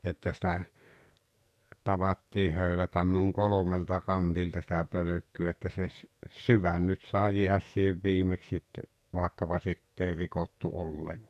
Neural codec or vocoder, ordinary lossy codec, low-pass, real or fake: codec, 44.1 kHz, 2.6 kbps, SNAC; none; 14.4 kHz; fake